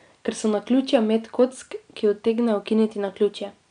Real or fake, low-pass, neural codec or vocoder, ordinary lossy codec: real; 9.9 kHz; none; none